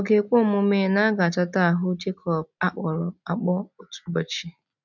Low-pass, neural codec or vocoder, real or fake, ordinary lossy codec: 7.2 kHz; none; real; none